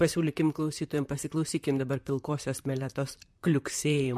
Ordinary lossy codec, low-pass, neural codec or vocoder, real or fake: MP3, 64 kbps; 14.4 kHz; vocoder, 44.1 kHz, 128 mel bands, Pupu-Vocoder; fake